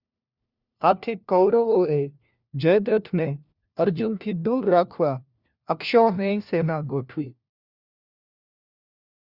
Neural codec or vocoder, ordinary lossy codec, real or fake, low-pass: codec, 16 kHz, 1 kbps, FunCodec, trained on LibriTTS, 50 frames a second; Opus, 64 kbps; fake; 5.4 kHz